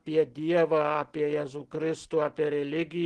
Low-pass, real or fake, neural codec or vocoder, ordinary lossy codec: 10.8 kHz; real; none; Opus, 16 kbps